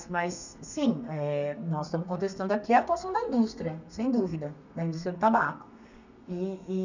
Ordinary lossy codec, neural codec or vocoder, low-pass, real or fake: none; codec, 32 kHz, 1.9 kbps, SNAC; 7.2 kHz; fake